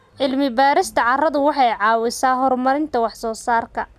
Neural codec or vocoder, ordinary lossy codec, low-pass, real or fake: none; none; 14.4 kHz; real